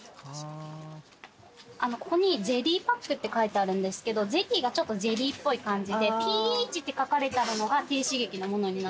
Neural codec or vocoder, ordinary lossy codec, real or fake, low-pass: none; none; real; none